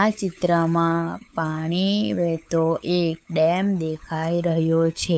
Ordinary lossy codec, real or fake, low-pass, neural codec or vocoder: none; fake; none; codec, 16 kHz, 8 kbps, FunCodec, trained on LibriTTS, 25 frames a second